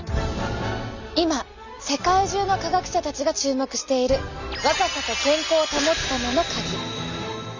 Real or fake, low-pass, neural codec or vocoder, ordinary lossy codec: real; 7.2 kHz; none; none